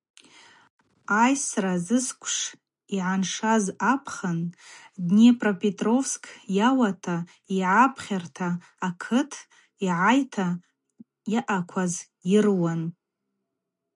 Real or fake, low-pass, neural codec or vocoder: real; 10.8 kHz; none